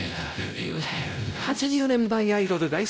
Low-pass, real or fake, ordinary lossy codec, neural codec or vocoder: none; fake; none; codec, 16 kHz, 0.5 kbps, X-Codec, WavLM features, trained on Multilingual LibriSpeech